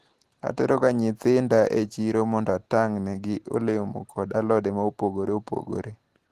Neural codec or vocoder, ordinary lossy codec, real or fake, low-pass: none; Opus, 16 kbps; real; 14.4 kHz